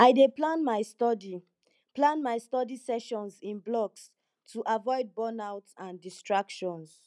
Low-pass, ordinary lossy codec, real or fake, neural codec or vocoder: none; none; real; none